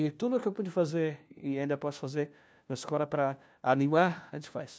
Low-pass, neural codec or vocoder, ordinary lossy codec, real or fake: none; codec, 16 kHz, 1 kbps, FunCodec, trained on LibriTTS, 50 frames a second; none; fake